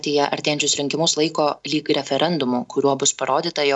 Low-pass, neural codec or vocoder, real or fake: 10.8 kHz; none; real